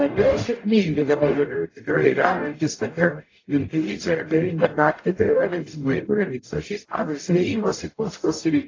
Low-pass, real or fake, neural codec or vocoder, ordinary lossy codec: 7.2 kHz; fake; codec, 44.1 kHz, 0.9 kbps, DAC; AAC, 32 kbps